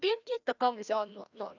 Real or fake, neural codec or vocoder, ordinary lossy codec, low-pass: fake; codec, 16 kHz, 1 kbps, FreqCodec, larger model; none; 7.2 kHz